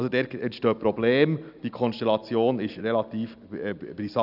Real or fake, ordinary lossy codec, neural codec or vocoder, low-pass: real; none; none; 5.4 kHz